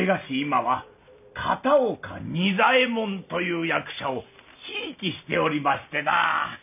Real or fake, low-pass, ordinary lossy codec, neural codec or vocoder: real; 3.6 kHz; none; none